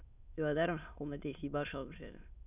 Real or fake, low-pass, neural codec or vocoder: fake; 3.6 kHz; autoencoder, 22.05 kHz, a latent of 192 numbers a frame, VITS, trained on many speakers